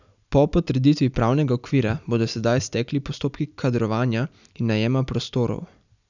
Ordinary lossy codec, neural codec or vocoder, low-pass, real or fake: none; none; 7.2 kHz; real